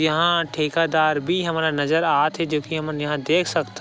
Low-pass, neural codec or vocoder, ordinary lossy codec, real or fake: none; none; none; real